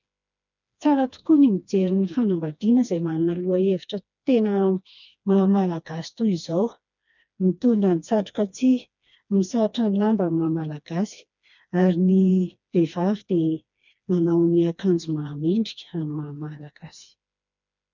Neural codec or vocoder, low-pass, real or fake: codec, 16 kHz, 2 kbps, FreqCodec, smaller model; 7.2 kHz; fake